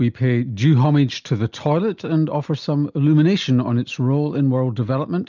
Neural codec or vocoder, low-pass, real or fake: none; 7.2 kHz; real